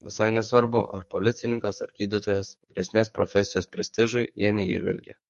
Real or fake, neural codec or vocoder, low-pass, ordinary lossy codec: fake; codec, 44.1 kHz, 2.6 kbps, SNAC; 14.4 kHz; MP3, 48 kbps